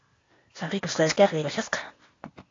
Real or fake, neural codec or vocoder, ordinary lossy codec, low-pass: fake; codec, 16 kHz, 0.8 kbps, ZipCodec; AAC, 32 kbps; 7.2 kHz